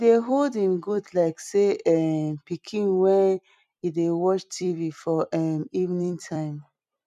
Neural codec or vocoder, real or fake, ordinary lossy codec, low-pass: vocoder, 44.1 kHz, 128 mel bands every 256 samples, BigVGAN v2; fake; none; 14.4 kHz